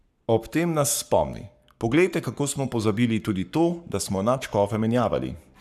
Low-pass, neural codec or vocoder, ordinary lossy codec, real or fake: 14.4 kHz; codec, 44.1 kHz, 7.8 kbps, Pupu-Codec; none; fake